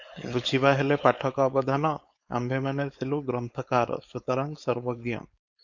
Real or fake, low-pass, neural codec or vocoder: fake; 7.2 kHz; codec, 16 kHz, 4.8 kbps, FACodec